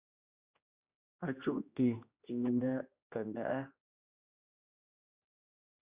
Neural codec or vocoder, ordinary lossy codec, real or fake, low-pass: codec, 16 kHz, 1 kbps, X-Codec, HuBERT features, trained on general audio; Opus, 64 kbps; fake; 3.6 kHz